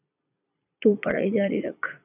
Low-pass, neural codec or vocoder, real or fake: 3.6 kHz; vocoder, 22.05 kHz, 80 mel bands, Vocos; fake